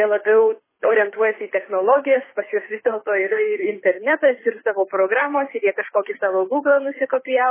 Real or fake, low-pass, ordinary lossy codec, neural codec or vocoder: fake; 3.6 kHz; MP3, 16 kbps; codec, 24 kHz, 6 kbps, HILCodec